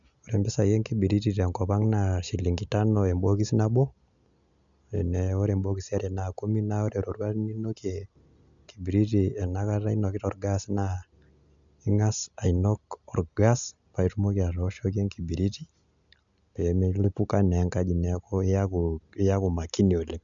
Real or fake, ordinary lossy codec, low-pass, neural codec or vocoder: real; none; 7.2 kHz; none